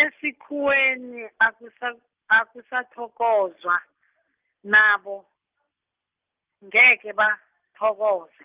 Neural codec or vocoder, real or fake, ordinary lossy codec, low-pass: none; real; Opus, 32 kbps; 3.6 kHz